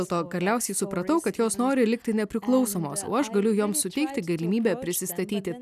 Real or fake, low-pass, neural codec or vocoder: real; 14.4 kHz; none